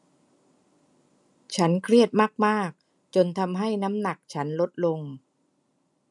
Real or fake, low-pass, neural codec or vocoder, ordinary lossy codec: real; 10.8 kHz; none; none